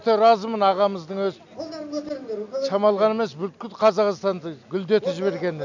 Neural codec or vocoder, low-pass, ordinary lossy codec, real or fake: none; 7.2 kHz; none; real